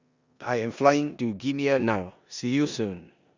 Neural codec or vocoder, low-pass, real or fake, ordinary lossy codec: codec, 16 kHz in and 24 kHz out, 0.9 kbps, LongCat-Audio-Codec, four codebook decoder; 7.2 kHz; fake; Opus, 64 kbps